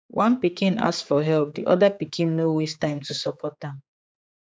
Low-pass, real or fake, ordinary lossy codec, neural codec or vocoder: none; fake; none; codec, 16 kHz, 4 kbps, X-Codec, HuBERT features, trained on general audio